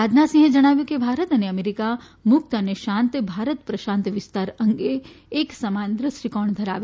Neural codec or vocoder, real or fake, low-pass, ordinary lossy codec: none; real; none; none